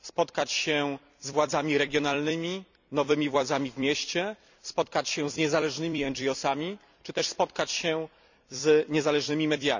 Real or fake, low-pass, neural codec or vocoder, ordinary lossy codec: fake; 7.2 kHz; vocoder, 44.1 kHz, 128 mel bands every 256 samples, BigVGAN v2; none